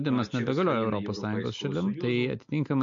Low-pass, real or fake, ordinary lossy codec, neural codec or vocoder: 7.2 kHz; real; MP3, 48 kbps; none